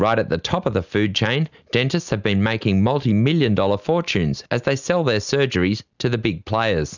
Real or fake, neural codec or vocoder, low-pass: real; none; 7.2 kHz